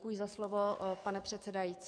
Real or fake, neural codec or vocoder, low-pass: fake; codec, 44.1 kHz, 7.8 kbps, DAC; 9.9 kHz